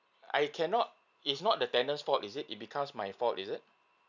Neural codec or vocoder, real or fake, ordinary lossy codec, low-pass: none; real; none; 7.2 kHz